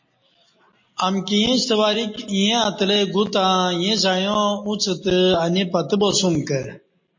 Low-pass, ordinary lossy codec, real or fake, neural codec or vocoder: 7.2 kHz; MP3, 32 kbps; real; none